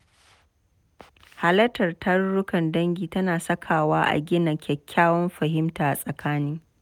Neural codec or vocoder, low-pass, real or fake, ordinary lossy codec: none; 19.8 kHz; real; none